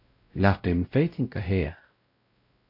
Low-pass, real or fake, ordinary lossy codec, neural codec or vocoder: 5.4 kHz; fake; AAC, 32 kbps; codec, 16 kHz, 0.5 kbps, X-Codec, WavLM features, trained on Multilingual LibriSpeech